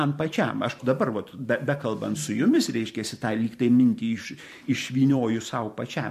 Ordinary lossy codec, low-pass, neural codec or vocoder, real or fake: MP3, 64 kbps; 14.4 kHz; vocoder, 44.1 kHz, 128 mel bands every 512 samples, BigVGAN v2; fake